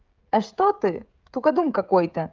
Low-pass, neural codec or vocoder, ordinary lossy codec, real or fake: 7.2 kHz; codec, 16 kHz, 16 kbps, FreqCodec, smaller model; Opus, 24 kbps; fake